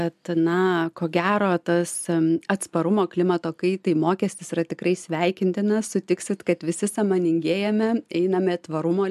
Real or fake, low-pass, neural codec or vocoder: real; 14.4 kHz; none